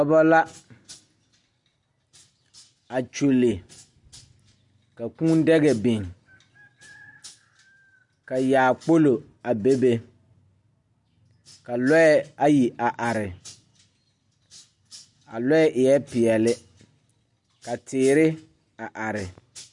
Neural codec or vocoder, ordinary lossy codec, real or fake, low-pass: none; MP3, 64 kbps; real; 10.8 kHz